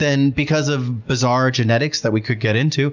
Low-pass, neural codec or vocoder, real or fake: 7.2 kHz; none; real